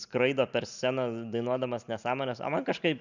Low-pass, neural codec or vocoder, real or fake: 7.2 kHz; none; real